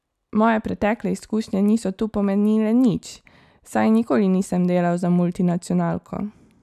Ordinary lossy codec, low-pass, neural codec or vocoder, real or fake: none; 14.4 kHz; none; real